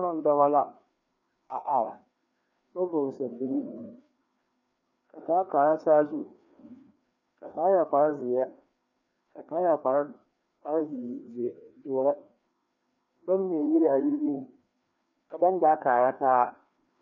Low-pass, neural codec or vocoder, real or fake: 7.2 kHz; codec, 16 kHz, 2 kbps, FreqCodec, larger model; fake